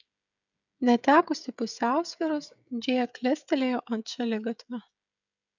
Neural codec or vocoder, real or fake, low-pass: codec, 16 kHz, 16 kbps, FreqCodec, smaller model; fake; 7.2 kHz